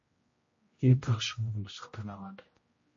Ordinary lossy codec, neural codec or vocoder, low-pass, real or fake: MP3, 32 kbps; codec, 16 kHz, 0.5 kbps, X-Codec, HuBERT features, trained on general audio; 7.2 kHz; fake